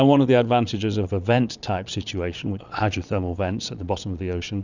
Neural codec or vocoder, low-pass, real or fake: vocoder, 22.05 kHz, 80 mel bands, Vocos; 7.2 kHz; fake